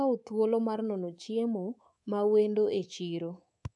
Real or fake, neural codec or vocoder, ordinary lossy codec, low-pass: fake; codec, 24 kHz, 3.1 kbps, DualCodec; none; 10.8 kHz